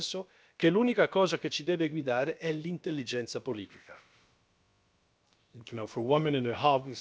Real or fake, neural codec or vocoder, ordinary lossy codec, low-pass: fake; codec, 16 kHz, about 1 kbps, DyCAST, with the encoder's durations; none; none